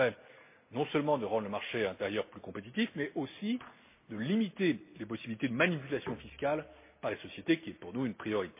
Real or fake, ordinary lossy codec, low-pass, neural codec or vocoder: real; MP3, 24 kbps; 3.6 kHz; none